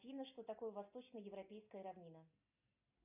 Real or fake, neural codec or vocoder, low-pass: real; none; 3.6 kHz